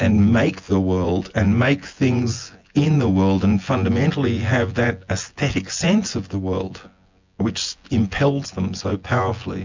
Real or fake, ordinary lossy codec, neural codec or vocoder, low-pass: fake; MP3, 64 kbps; vocoder, 24 kHz, 100 mel bands, Vocos; 7.2 kHz